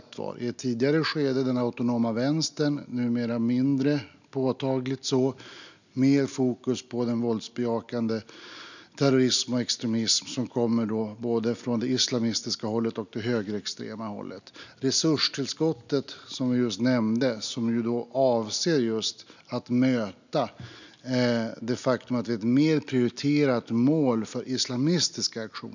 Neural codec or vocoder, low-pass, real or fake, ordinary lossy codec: none; 7.2 kHz; real; none